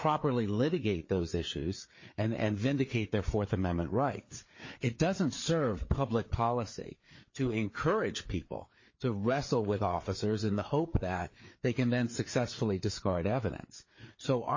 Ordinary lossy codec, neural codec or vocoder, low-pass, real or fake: MP3, 32 kbps; codec, 16 kHz, 4 kbps, FreqCodec, larger model; 7.2 kHz; fake